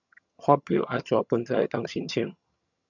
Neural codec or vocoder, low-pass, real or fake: vocoder, 22.05 kHz, 80 mel bands, HiFi-GAN; 7.2 kHz; fake